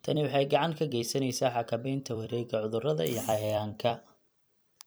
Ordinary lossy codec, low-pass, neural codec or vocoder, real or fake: none; none; vocoder, 44.1 kHz, 128 mel bands every 256 samples, BigVGAN v2; fake